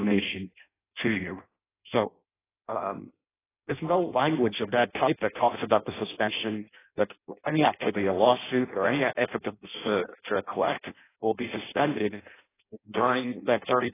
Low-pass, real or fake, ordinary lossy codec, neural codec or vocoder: 3.6 kHz; fake; AAC, 16 kbps; codec, 16 kHz in and 24 kHz out, 0.6 kbps, FireRedTTS-2 codec